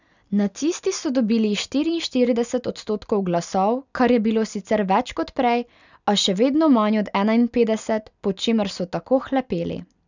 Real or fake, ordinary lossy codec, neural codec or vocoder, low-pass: real; none; none; 7.2 kHz